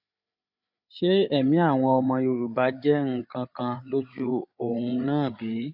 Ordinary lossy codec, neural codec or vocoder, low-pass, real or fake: AAC, 48 kbps; codec, 16 kHz, 8 kbps, FreqCodec, larger model; 5.4 kHz; fake